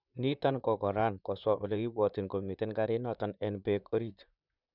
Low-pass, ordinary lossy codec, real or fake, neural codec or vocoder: 5.4 kHz; none; fake; vocoder, 44.1 kHz, 128 mel bands, Pupu-Vocoder